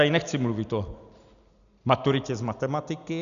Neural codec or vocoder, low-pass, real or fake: none; 7.2 kHz; real